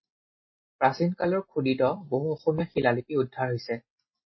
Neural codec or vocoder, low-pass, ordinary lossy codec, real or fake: none; 7.2 kHz; MP3, 24 kbps; real